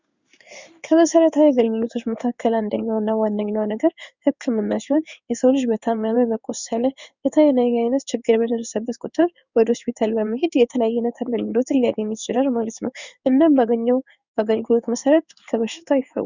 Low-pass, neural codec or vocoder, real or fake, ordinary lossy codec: 7.2 kHz; codec, 16 kHz in and 24 kHz out, 1 kbps, XY-Tokenizer; fake; Opus, 64 kbps